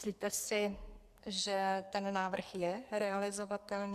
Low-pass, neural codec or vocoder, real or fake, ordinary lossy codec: 14.4 kHz; codec, 44.1 kHz, 2.6 kbps, SNAC; fake; Opus, 64 kbps